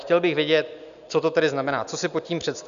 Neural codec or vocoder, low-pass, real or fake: none; 7.2 kHz; real